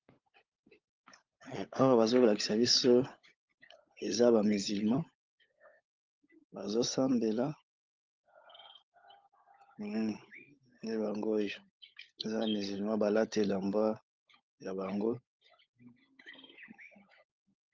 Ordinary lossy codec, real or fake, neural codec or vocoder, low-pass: Opus, 24 kbps; fake; codec, 16 kHz, 16 kbps, FunCodec, trained on LibriTTS, 50 frames a second; 7.2 kHz